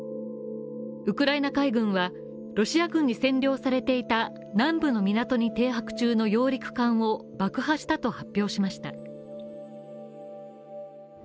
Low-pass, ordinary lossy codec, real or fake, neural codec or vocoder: none; none; real; none